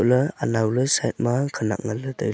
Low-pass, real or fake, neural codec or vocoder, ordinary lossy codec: none; real; none; none